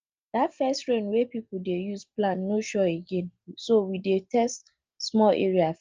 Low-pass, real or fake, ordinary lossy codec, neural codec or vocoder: 7.2 kHz; real; Opus, 24 kbps; none